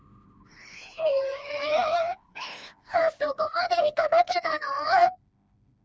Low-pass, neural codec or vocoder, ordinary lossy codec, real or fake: none; codec, 16 kHz, 2 kbps, FreqCodec, smaller model; none; fake